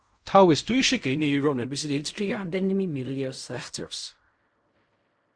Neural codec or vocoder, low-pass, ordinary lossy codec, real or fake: codec, 16 kHz in and 24 kHz out, 0.4 kbps, LongCat-Audio-Codec, fine tuned four codebook decoder; 9.9 kHz; Opus, 64 kbps; fake